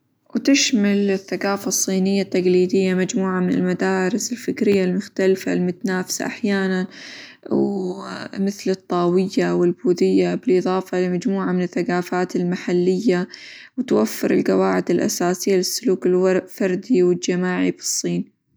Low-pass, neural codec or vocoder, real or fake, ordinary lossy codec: none; none; real; none